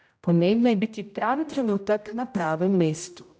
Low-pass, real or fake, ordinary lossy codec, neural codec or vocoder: none; fake; none; codec, 16 kHz, 0.5 kbps, X-Codec, HuBERT features, trained on general audio